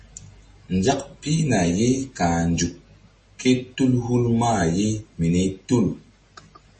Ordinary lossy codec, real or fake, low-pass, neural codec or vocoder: MP3, 32 kbps; real; 10.8 kHz; none